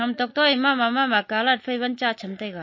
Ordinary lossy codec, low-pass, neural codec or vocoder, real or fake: MP3, 32 kbps; 7.2 kHz; none; real